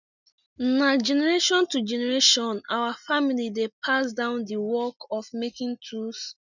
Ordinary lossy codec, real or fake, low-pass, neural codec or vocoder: none; real; 7.2 kHz; none